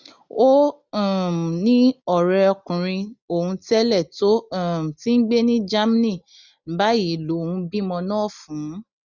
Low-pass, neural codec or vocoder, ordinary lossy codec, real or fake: 7.2 kHz; none; none; real